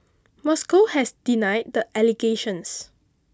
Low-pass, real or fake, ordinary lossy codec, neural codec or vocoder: none; real; none; none